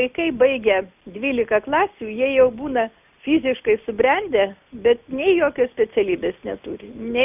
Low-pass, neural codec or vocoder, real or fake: 3.6 kHz; none; real